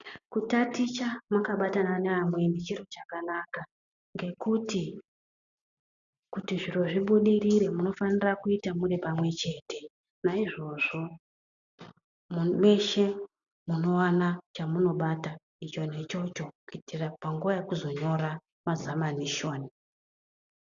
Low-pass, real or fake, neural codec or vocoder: 7.2 kHz; real; none